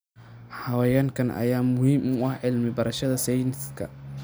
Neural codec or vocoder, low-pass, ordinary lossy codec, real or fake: none; none; none; real